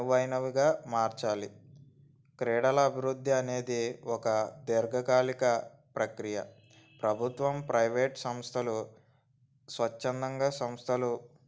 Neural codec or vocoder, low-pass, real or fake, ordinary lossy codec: none; none; real; none